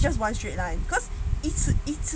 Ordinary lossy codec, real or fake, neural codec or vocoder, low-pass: none; real; none; none